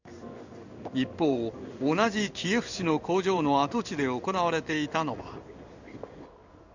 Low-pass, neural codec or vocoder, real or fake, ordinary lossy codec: 7.2 kHz; codec, 16 kHz in and 24 kHz out, 1 kbps, XY-Tokenizer; fake; none